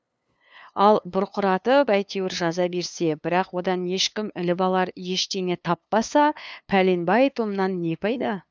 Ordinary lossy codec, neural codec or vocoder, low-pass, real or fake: none; codec, 16 kHz, 2 kbps, FunCodec, trained on LibriTTS, 25 frames a second; none; fake